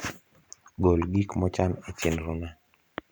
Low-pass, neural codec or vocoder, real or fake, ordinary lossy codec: none; vocoder, 44.1 kHz, 128 mel bands every 512 samples, BigVGAN v2; fake; none